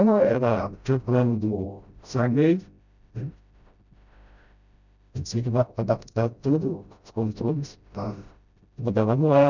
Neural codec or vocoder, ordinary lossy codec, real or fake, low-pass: codec, 16 kHz, 0.5 kbps, FreqCodec, smaller model; none; fake; 7.2 kHz